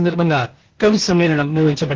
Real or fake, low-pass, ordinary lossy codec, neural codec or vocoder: fake; 7.2 kHz; Opus, 16 kbps; codec, 16 kHz, 0.8 kbps, ZipCodec